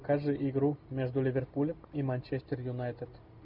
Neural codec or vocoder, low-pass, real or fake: none; 5.4 kHz; real